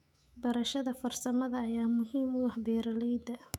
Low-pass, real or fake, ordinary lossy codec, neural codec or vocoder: 19.8 kHz; fake; none; autoencoder, 48 kHz, 128 numbers a frame, DAC-VAE, trained on Japanese speech